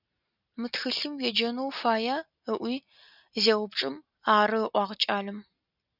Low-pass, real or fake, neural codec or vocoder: 5.4 kHz; real; none